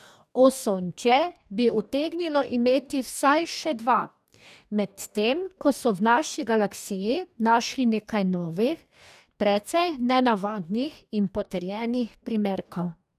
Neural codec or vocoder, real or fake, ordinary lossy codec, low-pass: codec, 44.1 kHz, 2.6 kbps, DAC; fake; none; 14.4 kHz